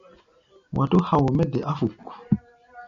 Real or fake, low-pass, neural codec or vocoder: real; 7.2 kHz; none